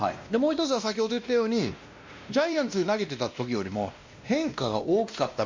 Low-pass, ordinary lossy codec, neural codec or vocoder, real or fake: 7.2 kHz; MP3, 48 kbps; codec, 16 kHz, 1 kbps, X-Codec, WavLM features, trained on Multilingual LibriSpeech; fake